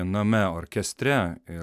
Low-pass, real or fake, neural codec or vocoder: 14.4 kHz; real; none